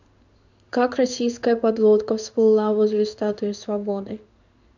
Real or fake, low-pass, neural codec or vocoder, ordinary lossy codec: fake; 7.2 kHz; codec, 16 kHz in and 24 kHz out, 1 kbps, XY-Tokenizer; none